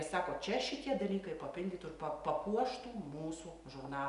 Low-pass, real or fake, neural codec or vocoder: 10.8 kHz; real; none